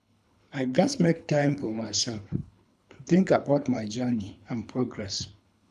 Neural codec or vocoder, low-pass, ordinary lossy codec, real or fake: codec, 24 kHz, 3 kbps, HILCodec; none; none; fake